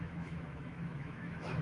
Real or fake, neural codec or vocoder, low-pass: fake; autoencoder, 48 kHz, 128 numbers a frame, DAC-VAE, trained on Japanese speech; 10.8 kHz